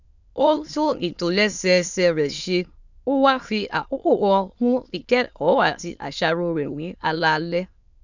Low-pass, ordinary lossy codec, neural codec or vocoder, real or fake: 7.2 kHz; none; autoencoder, 22.05 kHz, a latent of 192 numbers a frame, VITS, trained on many speakers; fake